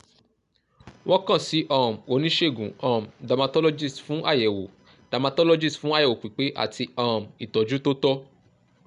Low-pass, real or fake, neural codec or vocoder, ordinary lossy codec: 10.8 kHz; real; none; none